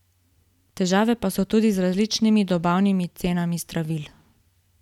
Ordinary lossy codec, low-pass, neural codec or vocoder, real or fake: none; 19.8 kHz; none; real